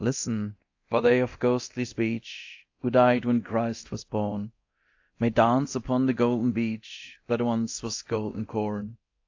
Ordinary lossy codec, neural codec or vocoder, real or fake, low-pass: AAC, 48 kbps; codec, 24 kHz, 0.9 kbps, DualCodec; fake; 7.2 kHz